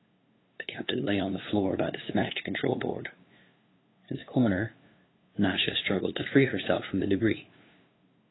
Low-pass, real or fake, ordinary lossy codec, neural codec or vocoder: 7.2 kHz; fake; AAC, 16 kbps; codec, 16 kHz, 2 kbps, FunCodec, trained on LibriTTS, 25 frames a second